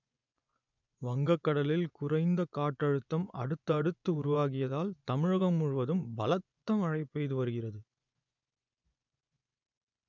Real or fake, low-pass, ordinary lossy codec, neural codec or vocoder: fake; 7.2 kHz; none; vocoder, 24 kHz, 100 mel bands, Vocos